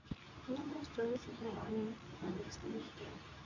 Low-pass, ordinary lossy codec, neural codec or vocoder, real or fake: 7.2 kHz; none; codec, 24 kHz, 0.9 kbps, WavTokenizer, medium speech release version 2; fake